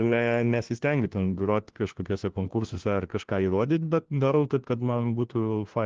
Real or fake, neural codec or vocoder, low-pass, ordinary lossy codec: fake; codec, 16 kHz, 1 kbps, FunCodec, trained on LibriTTS, 50 frames a second; 7.2 kHz; Opus, 16 kbps